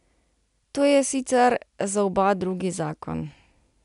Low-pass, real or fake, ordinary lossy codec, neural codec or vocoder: 10.8 kHz; real; MP3, 96 kbps; none